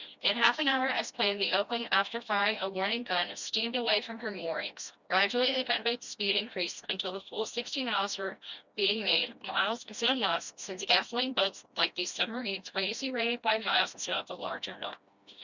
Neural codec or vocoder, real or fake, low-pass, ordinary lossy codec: codec, 16 kHz, 1 kbps, FreqCodec, smaller model; fake; 7.2 kHz; Opus, 64 kbps